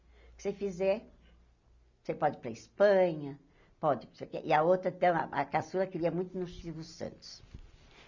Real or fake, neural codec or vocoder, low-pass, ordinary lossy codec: real; none; 7.2 kHz; none